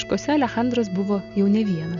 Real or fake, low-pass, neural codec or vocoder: real; 7.2 kHz; none